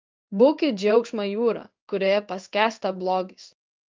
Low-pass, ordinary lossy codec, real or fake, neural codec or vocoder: 7.2 kHz; Opus, 24 kbps; fake; codec, 16 kHz in and 24 kHz out, 1 kbps, XY-Tokenizer